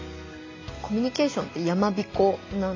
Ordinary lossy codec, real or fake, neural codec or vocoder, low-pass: AAC, 32 kbps; real; none; 7.2 kHz